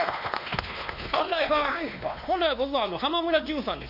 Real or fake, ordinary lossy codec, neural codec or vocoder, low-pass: fake; none; codec, 16 kHz, 2 kbps, X-Codec, WavLM features, trained on Multilingual LibriSpeech; 5.4 kHz